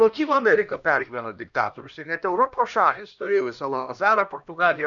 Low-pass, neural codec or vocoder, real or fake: 7.2 kHz; codec, 16 kHz, 1 kbps, X-Codec, HuBERT features, trained on LibriSpeech; fake